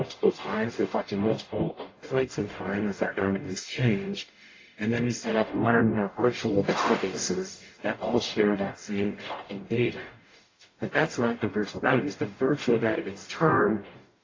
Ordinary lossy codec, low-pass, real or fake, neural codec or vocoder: AAC, 32 kbps; 7.2 kHz; fake; codec, 44.1 kHz, 0.9 kbps, DAC